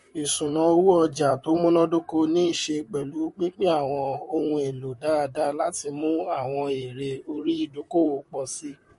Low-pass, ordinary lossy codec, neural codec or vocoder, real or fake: 14.4 kHz; MP3, 48 kbps; vocoder, 44.1 kHz, 128 mel bands, Pupu-Vocoder; fake